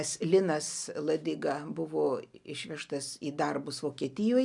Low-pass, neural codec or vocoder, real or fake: 10.8 kHz; none; real